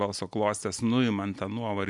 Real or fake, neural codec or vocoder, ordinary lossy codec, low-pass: real; none; AAC, 64 kbps; 10.8 kHz